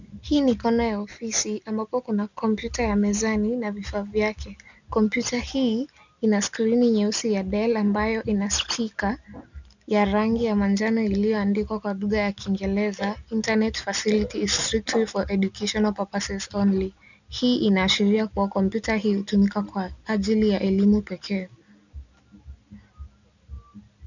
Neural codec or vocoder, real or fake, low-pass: none; real; 7.2 kHz